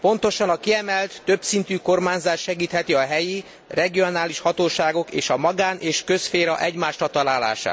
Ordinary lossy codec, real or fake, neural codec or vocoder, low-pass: none; real; none; none